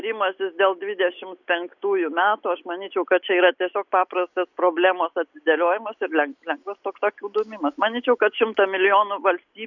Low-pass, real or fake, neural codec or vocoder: 7.2 kHz; real; none